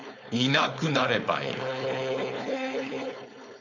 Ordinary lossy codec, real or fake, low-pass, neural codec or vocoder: none; fake; 7.2 kHz; codec, 16 kHz, 4.8 kbps, FACodec